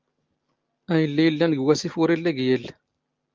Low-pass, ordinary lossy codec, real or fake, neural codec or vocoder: 7.2 kHz; Opus, 32 kbps; real; none